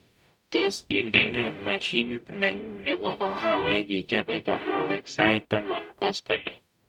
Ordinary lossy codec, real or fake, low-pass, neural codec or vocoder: none; fake; 19.8 kHz; codec, 44.1 kHz, 0.9 kbps, DAC